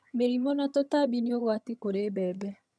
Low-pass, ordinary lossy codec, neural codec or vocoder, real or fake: none; none; vocoder, 22.05 kHz, 80 mel bands, HiFi-GAN; fake